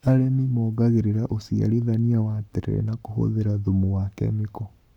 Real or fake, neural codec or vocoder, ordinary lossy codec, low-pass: fake; codec, 44.1 kHz, 7.8 kbps, Pupu-Codec; none; 19.8 kHz